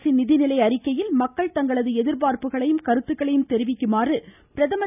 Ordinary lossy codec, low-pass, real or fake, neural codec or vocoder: none; 3.6 kHz; real; none